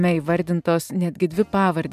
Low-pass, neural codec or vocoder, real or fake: 14.4 kHz; vocoder, 44.1 kHz, 128 mel bands every 512 samples, BigVGAN v2; fake